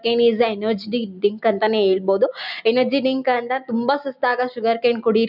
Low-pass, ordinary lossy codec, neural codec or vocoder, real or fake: 5.4 kHz; none; none; real